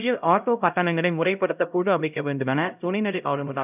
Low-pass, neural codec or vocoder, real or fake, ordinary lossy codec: 3.6 kHz; codec, 16 kHz, 0.5 kbps, X-Codec, HuBERT features, trained on LibriSpeech; fake; none